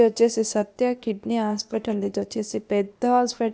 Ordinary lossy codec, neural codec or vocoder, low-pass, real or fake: none; codec, 16 kHz, 0.8 kbps, ZipCodec; none; fake